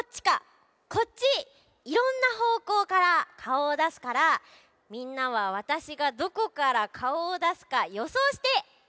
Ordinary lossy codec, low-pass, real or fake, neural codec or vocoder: none; none; real; none